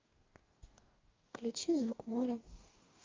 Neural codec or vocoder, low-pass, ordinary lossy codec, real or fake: codec, 44.1 kHz, 2.6 kbps, DAC; 7.2 kHz; Opus, 32 kbps; fake